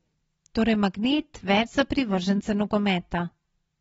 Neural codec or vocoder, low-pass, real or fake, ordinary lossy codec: none; 19.8 kHz; real; AAC, 24 kbps